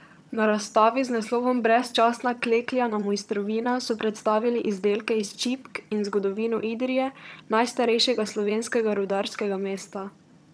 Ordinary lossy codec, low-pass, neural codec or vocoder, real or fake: none; none; vocoder, 22.05 kHz, 80 mel bands, HiFi-GAN; fake